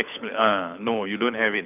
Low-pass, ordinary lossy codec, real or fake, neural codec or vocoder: 3.6 kHz; none; fake; codec, 24 kHz, 6 kbps, HILCodec